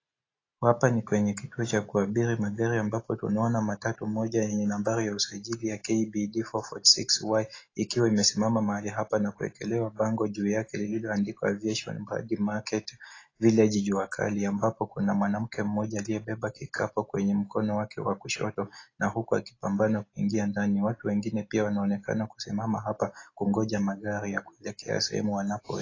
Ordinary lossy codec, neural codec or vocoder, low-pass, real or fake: AAC, 32 kbps; none; 7.2 kHz; real